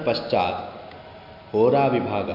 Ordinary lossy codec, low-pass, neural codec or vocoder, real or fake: none; 5.4 kHz; none; real